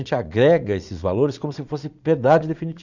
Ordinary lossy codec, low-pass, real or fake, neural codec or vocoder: none; 7.2 kHz; fake; vocoder, 44.1 kHz, 80 mel bands, Vocos